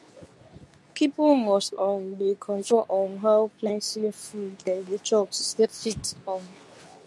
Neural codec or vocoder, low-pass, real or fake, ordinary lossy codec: codec, 24 kHz, 0.9 kbps, WavTokenizer, medium speech release version 2; none; fake; none